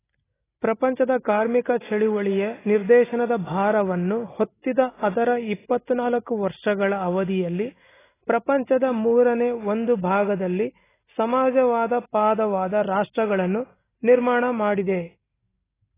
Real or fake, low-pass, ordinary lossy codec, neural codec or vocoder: real; 3.6 kHz; AAC, 16 kbps; none